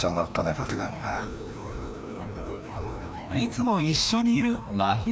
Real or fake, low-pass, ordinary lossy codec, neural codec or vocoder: fake; none; none; codec, 16 kHz, 1 kbps, FreqCodec, larger model